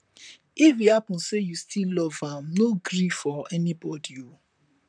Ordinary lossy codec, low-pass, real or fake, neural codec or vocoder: none; 9.9 kHz; fake; vocoder, 44.1 kHz, 128 mel bands, Pupu-Vocoder